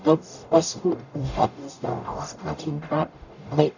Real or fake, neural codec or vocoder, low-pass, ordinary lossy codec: fake; codec, 44.1 kHz, 0.9 kbps, DAC; 7.2 kHz; none